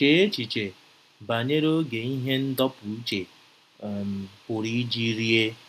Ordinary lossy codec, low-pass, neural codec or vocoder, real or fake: none; 14.4 kHz; none; real